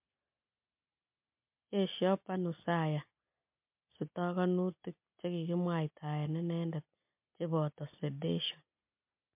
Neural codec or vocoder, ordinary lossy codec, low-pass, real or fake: none; MP3, 32 kbps; 3.6 kHz; real